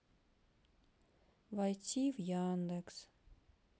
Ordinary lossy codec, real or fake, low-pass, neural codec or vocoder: none; real; none; none